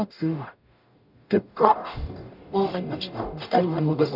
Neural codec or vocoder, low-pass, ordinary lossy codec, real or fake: codec, 44.1 kHz, 0.9 kbps, DAC; 5.4 kHz; none; fake